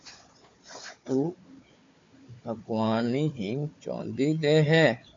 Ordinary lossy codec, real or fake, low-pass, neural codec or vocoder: MP3, 48 kbps; fake; 7.2 kHz; codec, 16 kHz, 4 kbps, FunCodec, trained on Chinese and English, 50 frames a second